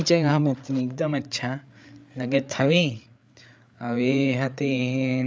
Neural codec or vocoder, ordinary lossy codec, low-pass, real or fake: codec, 16 kHz, 8 kbps, FreqCodec, larger model; none; none; fake